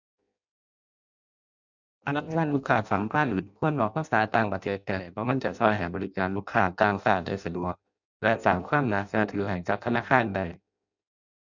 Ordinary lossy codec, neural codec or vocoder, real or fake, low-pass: none; codec, 16 kHz in and 24 kHz out, 0.6 kbps, FireRedTTS-2 codec; fake; 7.2 kHz